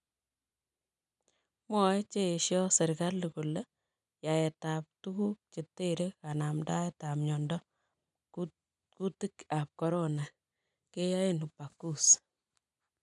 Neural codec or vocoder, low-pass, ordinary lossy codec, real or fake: none; 10.8 kHz; none; real